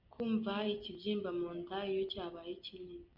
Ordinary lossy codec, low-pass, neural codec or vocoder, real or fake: MP3, 48 kbps; 5.4 kHz; none; real